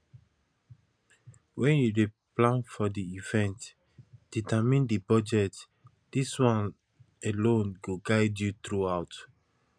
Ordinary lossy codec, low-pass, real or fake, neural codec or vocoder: none; 9.9 kHz; real; none